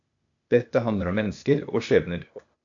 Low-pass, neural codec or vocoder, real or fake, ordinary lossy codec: 7.2 kHz; codec, 16 kHz, 0.8 kbps, ZipCodec; fake; AAC, 64 kbps